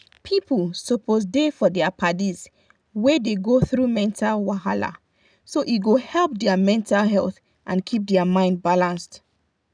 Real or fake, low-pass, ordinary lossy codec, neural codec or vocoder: real; 9.9 kHz; none; none